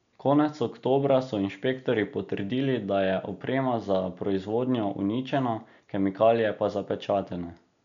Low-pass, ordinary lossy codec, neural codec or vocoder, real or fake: 7.2 kHz; none; none; real